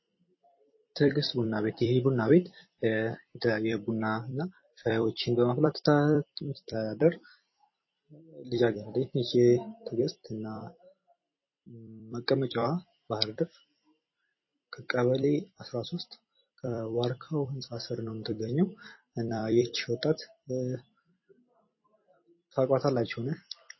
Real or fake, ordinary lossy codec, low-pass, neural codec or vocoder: fake; MP3, 24 kbps; 7.2 kHz; vocoder, 44.1 kHz, 128 mel bands every 256 samples, BigVGAN v2